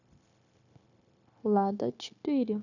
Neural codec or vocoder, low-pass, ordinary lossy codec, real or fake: codec, 16 kHz, 0.9 kbps, LongCat-Audio-Codec; 7.2 kHz; none; fake